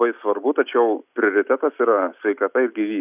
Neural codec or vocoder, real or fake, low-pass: none; real; 3.6 kHz